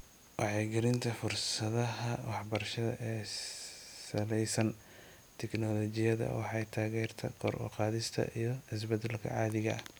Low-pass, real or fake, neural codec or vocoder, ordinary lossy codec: none; real; none; none